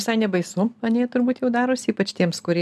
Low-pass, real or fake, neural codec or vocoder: 14.4 kHz; real; none